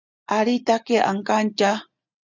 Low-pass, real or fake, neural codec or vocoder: 7.2 kHz; real; none